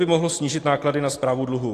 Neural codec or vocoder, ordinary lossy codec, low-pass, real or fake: none; AAC, 48 kbps; 14.4 kHz; real